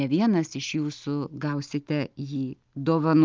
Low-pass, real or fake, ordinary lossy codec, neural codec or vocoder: 7.2 kHz; real; Opus, 32 kbps; none